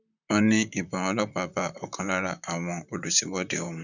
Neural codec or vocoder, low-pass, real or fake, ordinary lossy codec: vocoder, 44.1 kHz, 128 mel bands, Pupu-Vocoder; 7.2 kHz; fake; none